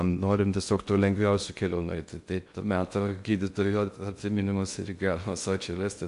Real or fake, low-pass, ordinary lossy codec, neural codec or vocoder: fake; 10.8 kHz; MP3, 64 kbps; codec, 16 kHz in and 24 kHz out, 0.6 kbps, FocalCodec, streaming, 2048 codes